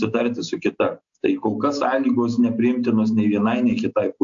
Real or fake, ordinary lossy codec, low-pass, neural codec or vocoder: real; AAC, 64 kbps; 7.2 kHz; none